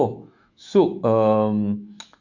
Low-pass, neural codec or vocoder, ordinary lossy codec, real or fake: 7.2 kHz; autoencoder, 48 kHz, 128 numbers a frame, DAC-VAE, trained on Japanese speech; Opus, 64 kbps; fake